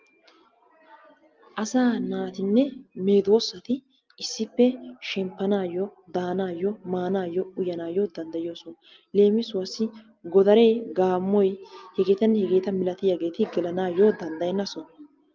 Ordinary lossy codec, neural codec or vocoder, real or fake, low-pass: Opus, 24 kbps; none; real; 7.2 kHz